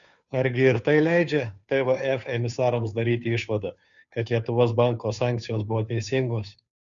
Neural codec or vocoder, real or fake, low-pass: codec, 16 kHz, 2 kbps, FunCodec, trained on Chinese and English, 25 frames a second; fake; 7.2 kHz